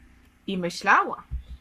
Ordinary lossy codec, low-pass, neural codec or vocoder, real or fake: Opus, 64 kbps; 14.4 kHz; codec, 44.1 kHz, 7.8 kbps, Pupu-Codec; fake